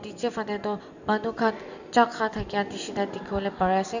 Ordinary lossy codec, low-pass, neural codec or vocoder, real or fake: none; 7.2 kHz; none; real